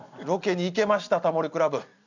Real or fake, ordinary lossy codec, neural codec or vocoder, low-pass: real; none; none; 7.2 kHz